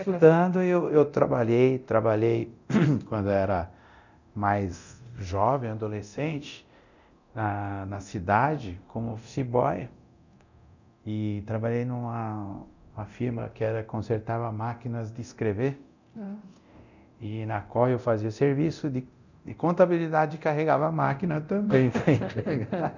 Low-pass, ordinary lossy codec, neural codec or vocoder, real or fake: 7.2 kHz; none; codec, 24 kHz, 0.9 kbps, DualCodec; fake